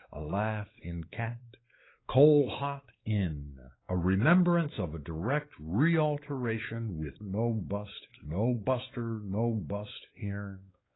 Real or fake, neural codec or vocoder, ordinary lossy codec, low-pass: fake; codec, 16 kHz, 4 kbps, X-Codec, WavLM features, trained on Multilingual LibriSpeech; AAC, 16 kbps; 7.2 kHz